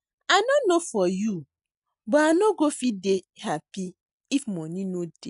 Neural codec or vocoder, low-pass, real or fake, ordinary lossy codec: none; 10.8 kHz; real; none